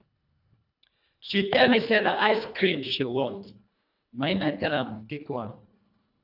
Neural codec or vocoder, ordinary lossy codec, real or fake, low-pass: codec, 24 kHz, 1.5 kbps, HILCodec; none; fake; 5.4 kHz